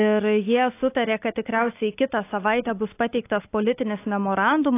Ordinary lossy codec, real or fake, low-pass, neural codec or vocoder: AAC, 24 kbps; real; 3.6 kHz; none